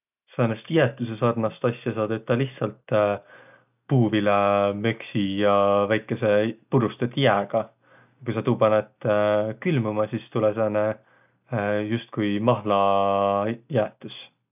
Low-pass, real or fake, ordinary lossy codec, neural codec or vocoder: 3.6 kHz; real; none; none